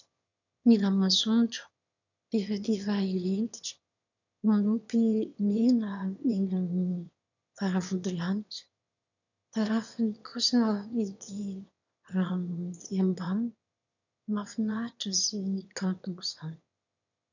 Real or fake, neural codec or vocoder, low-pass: fake; autoencoder, 22.05 kHz, a latent of 192 numbers a frame, VITS, trained on one speaker; 7.2 kHz